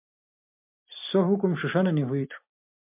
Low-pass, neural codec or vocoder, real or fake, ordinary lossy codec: 3.6 kHz; vocoder, 44.1 kHz, 128 mel bands every 256 samples, BigVGAN v2; fake; MP3, 32 kbps